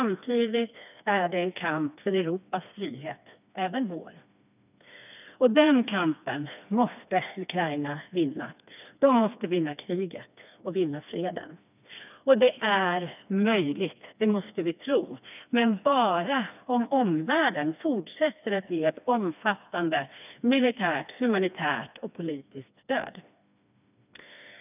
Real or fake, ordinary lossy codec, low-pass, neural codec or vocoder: fake; none; 3.6 kHz; codec, 16 kHz, 2 kbps, FreqCodec, smaller model